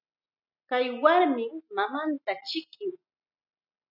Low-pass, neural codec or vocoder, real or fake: 5.4 kHz; none; real